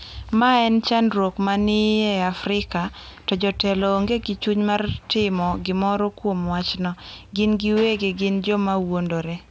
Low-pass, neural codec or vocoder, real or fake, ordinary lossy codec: none; none; real; none